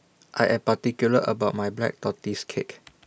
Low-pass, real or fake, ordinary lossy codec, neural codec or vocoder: none; real; none; none